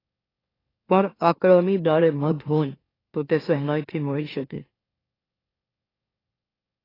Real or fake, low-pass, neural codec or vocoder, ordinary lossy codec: fake; 5.4 kHz; autoencoder, 44.1 kHz, a latent of 192 numbers a frame, MeloTTS; AAC, 24 kbps